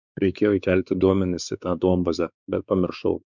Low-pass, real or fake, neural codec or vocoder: 7.2 kHz; fake; codec, 16 kHz, 4 kbps, X-Codec, WavLM features, trained on Multilingual LibriSpeech